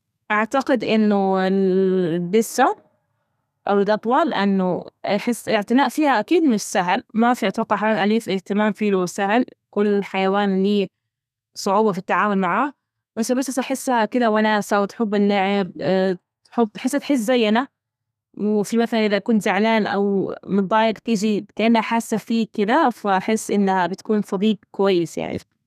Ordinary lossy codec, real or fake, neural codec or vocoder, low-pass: none; fake; codec, 32 kHz, 1.9 kbps, SNAC; 14.4 kHz